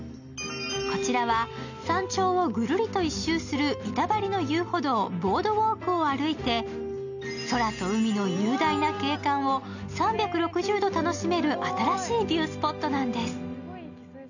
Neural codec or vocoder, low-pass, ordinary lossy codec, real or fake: none; 7.2 kHz; none; real